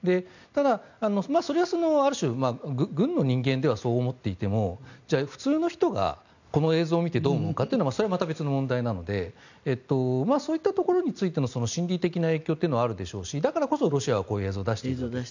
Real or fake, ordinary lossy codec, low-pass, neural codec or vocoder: real; none; 7.2 kHz; none